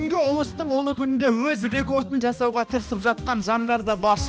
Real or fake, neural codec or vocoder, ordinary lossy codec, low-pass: fake; codec, 16 kHz, 1 kbps, X-Codec, HuBERT features, trained on balanced general audio; none; none